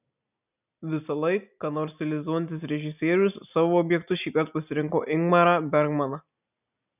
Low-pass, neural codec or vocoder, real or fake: 3.6 kHz; none; real